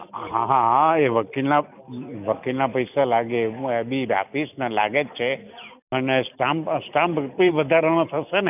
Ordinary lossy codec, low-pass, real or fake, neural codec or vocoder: none; 3.6 kHz; real; none